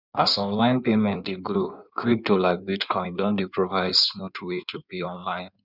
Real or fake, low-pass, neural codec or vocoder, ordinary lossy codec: fake; 5.4 kHz; codec, 16 kHz in and 24 kHz out, 1.1 kbps, FireRedTTS-2 codec; none